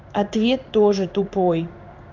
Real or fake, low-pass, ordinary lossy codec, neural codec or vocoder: fake; 7.2 kHz; none; codec, 16 kHz in and 24 kHz out, 1 kbps, XY-Tokenizer